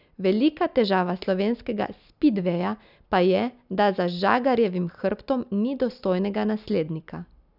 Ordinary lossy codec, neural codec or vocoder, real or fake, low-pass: none; none; real; 5.4 kHz